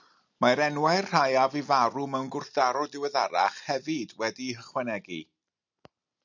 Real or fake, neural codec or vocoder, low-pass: real; none; 7.2 kHz